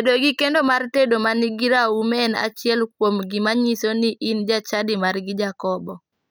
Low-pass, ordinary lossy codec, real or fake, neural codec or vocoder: none; none; real; none